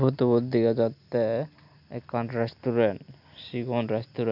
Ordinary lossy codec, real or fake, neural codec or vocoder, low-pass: none; real; none; 5.4 kHz